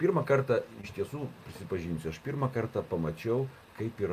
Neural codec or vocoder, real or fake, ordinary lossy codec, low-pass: none; real; MP3, 96 kbps; 14.4 kHz